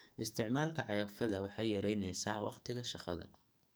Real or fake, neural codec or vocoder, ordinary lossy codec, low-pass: fake; codec, 44.1 kHz, 2.6 kbps, SNAC; none; none